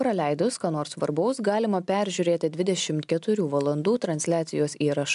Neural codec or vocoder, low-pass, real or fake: none; 10.8 kHz; real